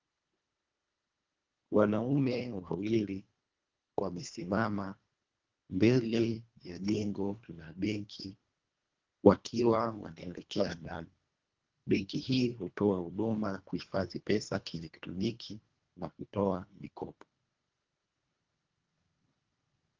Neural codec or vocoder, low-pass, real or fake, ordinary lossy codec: codec, 24 kHz, 1.5 kbps, HILCodec; 7.2 kHz; fake; Opus, 32 kbps